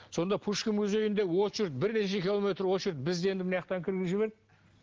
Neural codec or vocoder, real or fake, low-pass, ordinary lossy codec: none; real; 7.2 kHz; Opus, 16 kbps